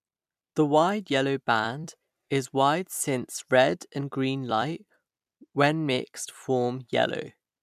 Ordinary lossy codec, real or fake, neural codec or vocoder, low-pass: MP3, 96 kbps; fake; vocoder, 44.1 kHz, 128 mel bands every 256 samples, BigVGAN v2; 14.4 kHz